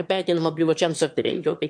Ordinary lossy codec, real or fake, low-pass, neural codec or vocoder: MP3, 64 kbps; fake; 9.9 kHz; autoencoder, 22.05 kHz, a latent of 192 numbers a frame, VITS, trained on one speaker